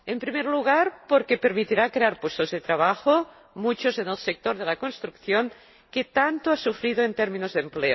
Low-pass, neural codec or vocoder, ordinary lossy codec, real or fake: 7.2 kHz; none; MP3, 24 kbps; real